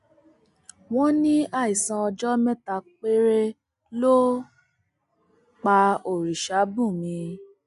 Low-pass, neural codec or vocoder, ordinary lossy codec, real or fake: 10.8 kHz; none; none; real